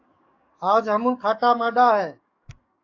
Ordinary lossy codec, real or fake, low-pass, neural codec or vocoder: AAC, 48 kbps; fake; 7.2 kHz; codec, 44.1 kHz, 7.8 kbps, DAC